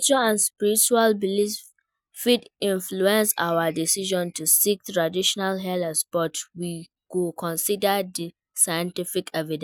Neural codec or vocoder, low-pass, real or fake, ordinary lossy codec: none; none; real; none